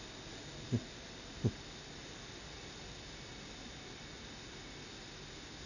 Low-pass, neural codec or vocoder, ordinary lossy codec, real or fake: 7.2 kHz; none; none; real